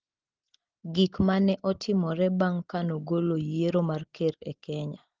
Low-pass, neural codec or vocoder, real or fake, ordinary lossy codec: 7.2 kHz; none; real; Opus, 16 kbps